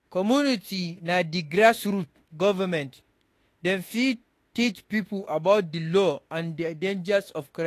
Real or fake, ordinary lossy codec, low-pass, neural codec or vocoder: fake; AAC, 48 kbps; 14.4 kHz; autoencoder, 48 kHz, 32 numbers a frame, DAC-VAE, trained on Japanese speech